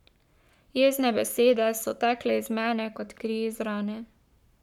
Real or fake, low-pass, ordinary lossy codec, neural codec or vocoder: fake; 19.8 kHz; none; codec, 44.1 kHz, 7.8 kbps, Pupu-Codec